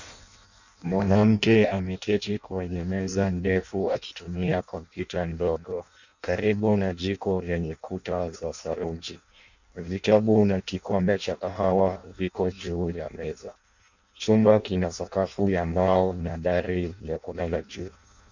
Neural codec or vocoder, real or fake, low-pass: codec, 16 kHz in and 24 kHz out, 0.6 kbps, FireRedTTS-2 codec; fake; 7.2 kHz